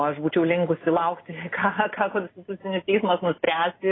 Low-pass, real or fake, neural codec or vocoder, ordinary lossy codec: 7.2 kHz; real; none; AAC, 16 kbps